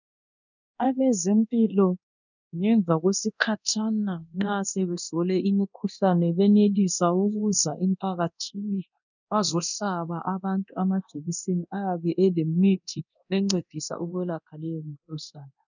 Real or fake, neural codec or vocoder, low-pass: fake; codec, 24 kHz, 0.9 kbps, DualCodec; 7.2 kHz